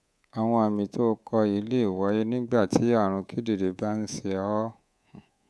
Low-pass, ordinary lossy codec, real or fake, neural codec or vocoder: none; none; fake; codec, 24 kHz, 3.1 kbps, DualCodec